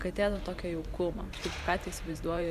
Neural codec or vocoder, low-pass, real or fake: vocoder, 44.1 kHz, 128 mel bands every 512 samples, BigVGAN v2; 14.4 kHz; fake